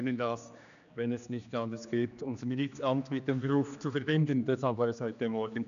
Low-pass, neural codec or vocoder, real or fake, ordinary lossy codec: 7.2 kHz; codec, 16 kHz, 2 kbps, X-Codec, HuBERT features, trained on general audio; fake; none